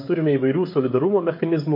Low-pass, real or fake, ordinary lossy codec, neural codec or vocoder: 5.4 kHz; fake; MP3, 32 kbps; codec, 16 kHz, 16 kbps, FreqCodec, smaller model